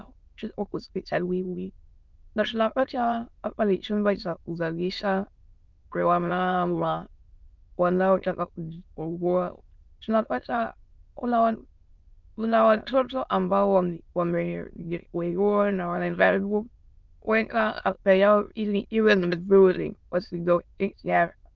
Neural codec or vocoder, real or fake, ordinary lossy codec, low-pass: autoencoder, 22.05 kHz, a latent of 192 numbers a frame, VITS, trained on many speakers; fake; Opus, 24 kbps; 7.2 kHz